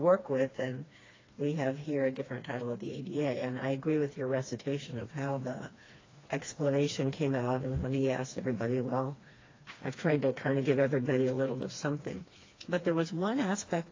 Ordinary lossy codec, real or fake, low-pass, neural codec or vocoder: AAC, 32 kbps; fake; 7.2 kHz; codec, 16 kHz, 2 kbps, FreqCodec, smaller model